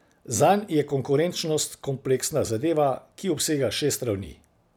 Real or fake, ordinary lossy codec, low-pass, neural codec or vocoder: real; none; none; none